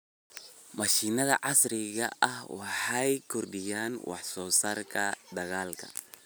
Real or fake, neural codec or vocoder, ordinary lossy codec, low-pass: real; none; none; none